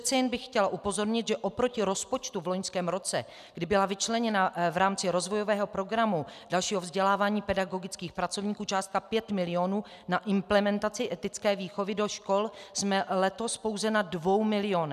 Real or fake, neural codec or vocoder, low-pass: real; none; 14.4 kHz